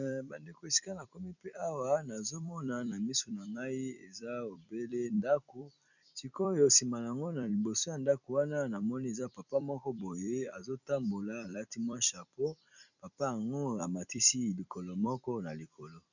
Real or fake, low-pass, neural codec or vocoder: fake; 7.2 kHz; vocoder, 44.1 kHz, 128 mel bands every 256 samples, BigVGAN v2